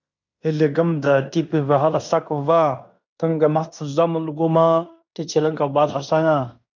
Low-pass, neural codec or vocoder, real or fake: 7.2 kHz; codec, 16 kHz in and 24 kHz out, 0.9 kbps, LongCat-Audio-Codec, fine tuned four codebook decoder; fake